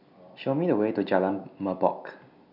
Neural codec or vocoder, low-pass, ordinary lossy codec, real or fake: none; 5.4 kHz; none; real